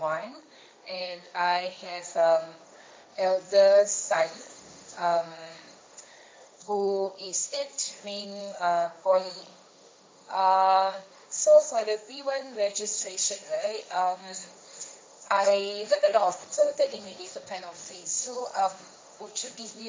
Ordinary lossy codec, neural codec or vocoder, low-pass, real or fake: none; codec, 16 kHz, 1.1 kbps, Voila-Tokenizer; none; fake